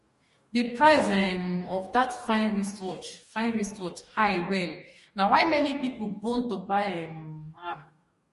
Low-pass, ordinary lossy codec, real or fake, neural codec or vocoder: 14.4 kHz; MP3, 48 kbps; fake; codec, 44.1 kHz, 2.6 kbps, DAC